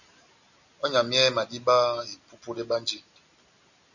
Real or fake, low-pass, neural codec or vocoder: real; 7.2 kHz; none